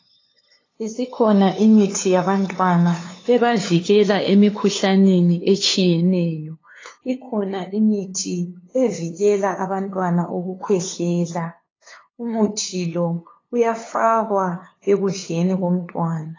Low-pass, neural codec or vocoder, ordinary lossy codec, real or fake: 7.2 kHz; codec, 16 kHz, 2 kbps, FunCodec, trained on LibriTTS, 25 frames a second; AAC, 32 kbps; fake